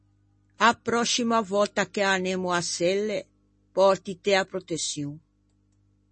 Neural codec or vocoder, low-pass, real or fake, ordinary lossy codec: none; 9.9 kHz; real; MP3, 32 kbps